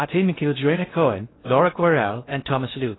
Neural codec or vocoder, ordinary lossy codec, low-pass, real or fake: codec, 16 kHz in and 24 kHz out, 0.6 kbps, FocalCodec, streaming, 2048 codes; AAC, 16 kbps; 7.2 kHz; fake